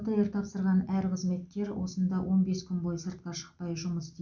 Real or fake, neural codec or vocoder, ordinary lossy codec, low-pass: real; none; none; 7.2 kHz